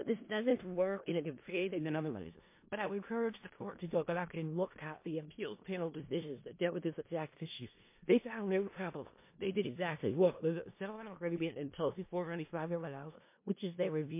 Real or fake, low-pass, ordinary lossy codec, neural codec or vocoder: fake; 3.6 kHz; MP3, 24 kbps; codec, 16 kHz in and 24 kHz out, 0.4 kbps, LongCat-Audio-Codec, four codebook decoder